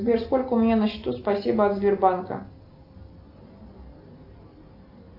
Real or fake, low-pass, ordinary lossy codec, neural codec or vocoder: real; 5.4 kHz; AAC, 32 kbps; none